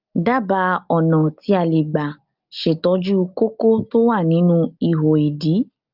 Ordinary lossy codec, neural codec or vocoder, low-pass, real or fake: Opus, 24 kbps; none; 5.4 kHz; real